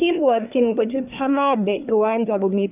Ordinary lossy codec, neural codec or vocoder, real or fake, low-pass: none; codec, 24 kHz, 1 kbps, SNAC; fake; 3.6 kHz